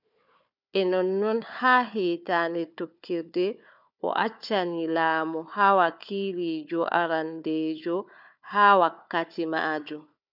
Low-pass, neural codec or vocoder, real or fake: 5.4 kHz; codec, 16 kHz, 4 kbps, FunCodec, trained on Chinese and English, 50 frames a second; fake